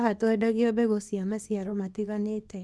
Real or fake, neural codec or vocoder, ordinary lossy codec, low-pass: fake; codec, 24 kHz, 0.9 kbps, WavTokenizer, small release; none; none